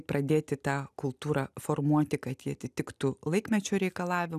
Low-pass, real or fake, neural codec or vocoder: 14.4 kHz; real; none